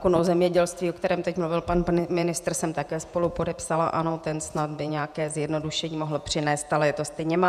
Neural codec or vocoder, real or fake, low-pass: vocoder, 48 kHz, 128 mel bands, Vocos; fake; 14.4 kHz